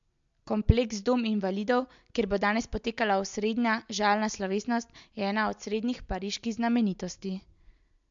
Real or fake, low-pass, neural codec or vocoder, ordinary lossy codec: real; 7.2 kHz; none; MP3, 64 kbps